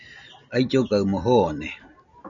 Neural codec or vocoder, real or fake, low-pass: none; real; 7.2 kHz